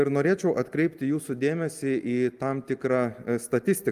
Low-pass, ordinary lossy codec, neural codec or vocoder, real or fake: 14.4 kHz; Opus, 32 kbps; none; real